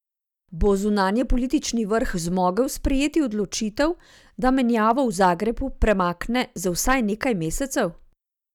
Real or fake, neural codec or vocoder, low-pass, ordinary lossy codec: real; none; 19.8 kHz; none